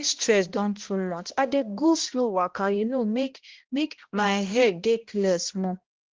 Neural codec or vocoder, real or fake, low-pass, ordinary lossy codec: codec, 16 kHz, 1 kbps, X-Codec, HuBERT features, trained on balanced general audio; fake; 7.2 kHz; Opus, 16 kbps